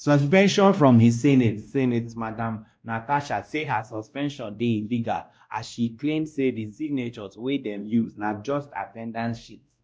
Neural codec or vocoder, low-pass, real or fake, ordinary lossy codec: codec, 16 kHz, 1 kbps, X-Codec, WavLM features, trained on Multilingual LibriSpeech; none; fake; none